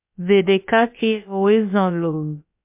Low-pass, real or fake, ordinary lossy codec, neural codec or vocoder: 3.6 kHz; fake; MP3, 32 kbps; codec, 16 kHz, about 1 kbps, DyCAST, with the encoder's durations